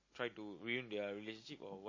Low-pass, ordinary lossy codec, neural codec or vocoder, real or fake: 7.2 kHz; MP3, 32 kbps; none; real